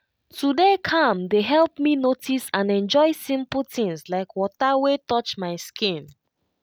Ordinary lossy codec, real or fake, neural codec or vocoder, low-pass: none; real; none; none